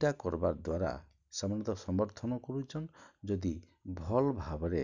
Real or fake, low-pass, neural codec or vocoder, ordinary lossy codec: real; 7.2 kHz; none; none